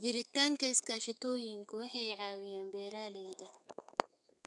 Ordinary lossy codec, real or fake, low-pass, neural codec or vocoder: none; fake; 10.8 kHz; codec, 32 kHz, 1.9 kbps, SNAC